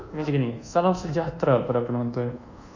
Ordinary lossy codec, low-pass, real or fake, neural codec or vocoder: none; 7.2 kHz; fake; codec, 24 kHz, 1.2 kbps, DualCodec